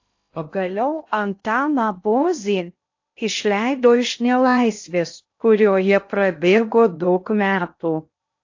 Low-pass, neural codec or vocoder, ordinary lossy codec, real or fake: 7.2 kHz; codec, 16 kHz in and 24 kHz out, 0.6 kbps, FocalCodec, streaming, 2048 codes; AAC, 48 kbps; fake